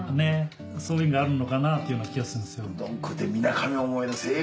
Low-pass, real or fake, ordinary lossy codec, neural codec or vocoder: none; real; none; none